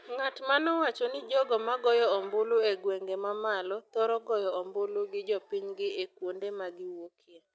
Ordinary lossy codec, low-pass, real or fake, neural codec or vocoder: none; none; real; none